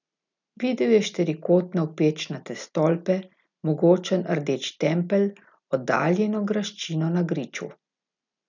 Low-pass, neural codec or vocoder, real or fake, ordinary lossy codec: 7.2 kHz; none; real; none